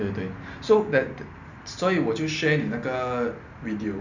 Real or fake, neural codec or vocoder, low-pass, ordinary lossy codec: real; none; 7.2 kHz; none